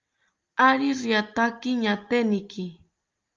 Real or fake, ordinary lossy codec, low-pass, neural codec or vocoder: real; Opus, 24 kbps; 7.2 kHz; none